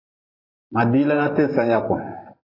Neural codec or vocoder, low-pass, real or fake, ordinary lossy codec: vocoder, 44.1 kHz, 128 mel bands every 512 samples, BigVGAN v2; 5.4 kHz; fake; AAC, 24 kbps